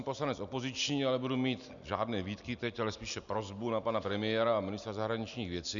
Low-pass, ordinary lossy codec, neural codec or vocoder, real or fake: 7.2 kHz; MP3, 96 kbps; none; real